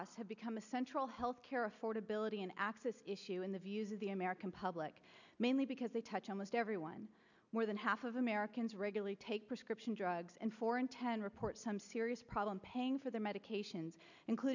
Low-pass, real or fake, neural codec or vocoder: 7.2 kHz; real; none